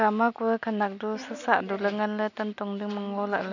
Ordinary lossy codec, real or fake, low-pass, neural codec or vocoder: none; real; 7.2 kHz; none